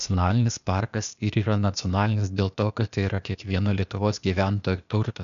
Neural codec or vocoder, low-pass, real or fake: codec, 16 kHz, 0.8 kbps, ZipCodec; 7.2 kHz; fake